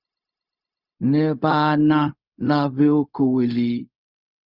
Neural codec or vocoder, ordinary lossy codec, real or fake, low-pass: codec, 16 kHz, 0.4 kbps, LongCat-Audio-Codec; Opus, 64 kbps; fake; 5.4 kHz